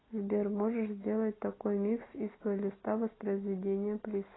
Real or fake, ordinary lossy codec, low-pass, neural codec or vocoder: real; AAC, 16 kbps; 7.2 kHz; none